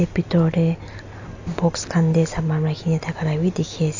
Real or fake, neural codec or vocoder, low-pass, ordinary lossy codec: real; none; 7.2 kHz; AAC, 48 kbps